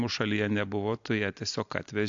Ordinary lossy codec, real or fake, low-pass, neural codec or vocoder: AAC, 64 kbps; real; 7.2 kHz; none